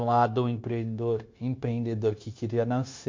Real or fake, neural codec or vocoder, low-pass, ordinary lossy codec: fake; codec, 16 kHz, 0.9 kbps, LongCat-Audio-Codec; 7.2 kHz; MP3, 48 kbps